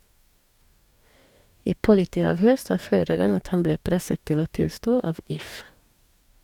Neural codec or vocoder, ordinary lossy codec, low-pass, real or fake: codec, 44.1 kHz, 2.6 kbps, DAC; none; 19.8 kHz; fake